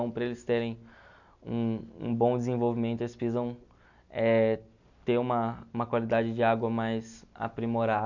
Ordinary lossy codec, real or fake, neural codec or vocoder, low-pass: none; real; none; 7.2 kHz